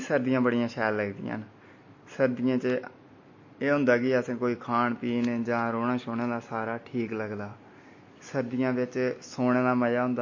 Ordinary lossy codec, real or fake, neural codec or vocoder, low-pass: MP3, 32 kbps; real; none; 7.2 kHz